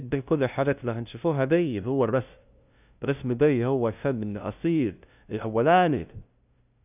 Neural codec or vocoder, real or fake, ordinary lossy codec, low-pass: codec, 16 kHz, 0.5 kbps, FunCodec, trained on LibriTTS, 25 frames a second; fake; none; 3.6 kHz